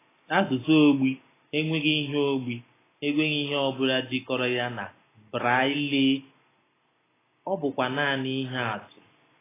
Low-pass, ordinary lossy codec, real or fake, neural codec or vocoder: 3.6 kHz; AAC, 16 kbps; real; none